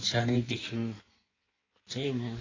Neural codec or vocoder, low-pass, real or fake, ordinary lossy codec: codec, 16 kHz in and 24 kHz out, 1.1 kbps, FireRedTTS-2 codec; 7.2 kHz; fake; AAC, 32 kbps